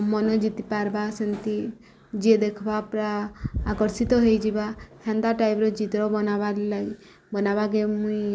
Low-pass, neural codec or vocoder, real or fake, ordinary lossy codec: none; none; real; none